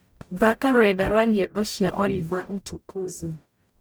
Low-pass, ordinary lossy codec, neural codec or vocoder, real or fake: none; none; codec, 44.1 kHz, 0.9 kbps, DAC; fake